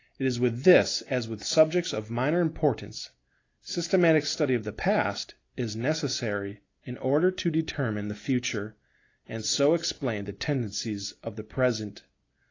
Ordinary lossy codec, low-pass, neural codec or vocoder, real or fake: AAC, 32 kbps; 7.2 kHz; none; real